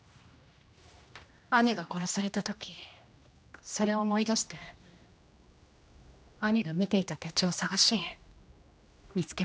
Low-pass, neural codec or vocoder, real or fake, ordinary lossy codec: none; codec, 16 kHz, 1 kbps, X-Codec, HuBERT features, trained on general audio; fake; none